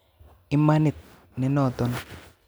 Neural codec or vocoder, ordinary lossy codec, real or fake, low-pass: none; none; real; none